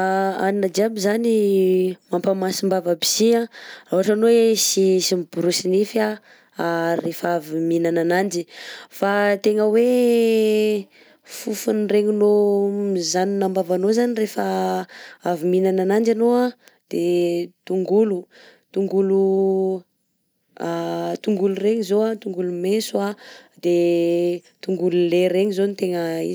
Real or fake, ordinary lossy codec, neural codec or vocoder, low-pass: real; none; none; none